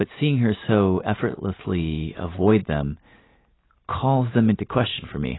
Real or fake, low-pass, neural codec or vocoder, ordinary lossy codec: real; 7.2 kHz; none; AAC, 16 kbps